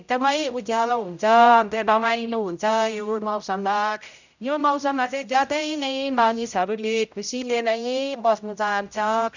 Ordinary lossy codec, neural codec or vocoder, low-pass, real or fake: none; codec, 16 kHz, 0.5 kbps, X-Codec, HuBERT features, trained on general audio; 7.2 kHz; fake